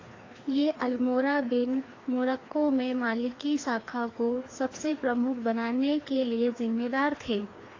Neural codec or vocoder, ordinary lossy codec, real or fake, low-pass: codec, 16 kHz, 2 kbps, FreqCodec, larger model; AAC, 32 kbps; fake; 7.2 kHz